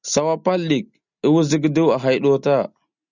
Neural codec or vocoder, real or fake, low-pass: none; real; 7.2 kHz